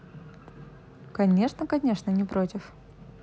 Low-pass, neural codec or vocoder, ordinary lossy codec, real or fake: none; none; none; real